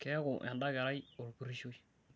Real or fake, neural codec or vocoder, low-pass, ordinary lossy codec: real; none; none; none